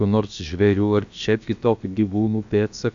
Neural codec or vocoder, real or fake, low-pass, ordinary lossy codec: codec, 16 kHz, about 1 kbps, DyCAST, with the encoder's durations; fake; 7.2 kHz; AAC, 64 kbps